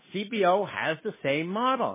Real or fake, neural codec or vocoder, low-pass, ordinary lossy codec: fake; codec, 16 kHz, 2 kbps, FunCodec, trained on Chinese and English, 25 frames a second; 3.6 kHz; MP3, 16 kbps